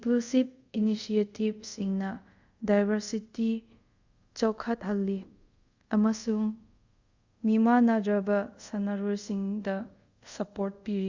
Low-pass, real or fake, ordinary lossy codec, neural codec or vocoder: 7.2 kHz; fake; Opus, 64 kbps; codec, 24 kHz, 0.5 kbps, DualCodec